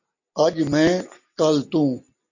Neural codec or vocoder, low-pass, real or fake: none; 7.2 kHz; real